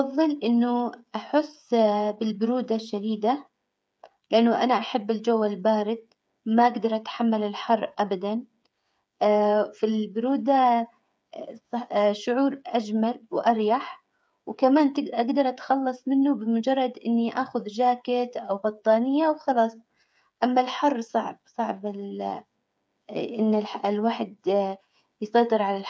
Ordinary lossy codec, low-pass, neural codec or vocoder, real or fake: none; none; codec, 16 kHz, 8 kbps, FreqCodec, smaller model; fake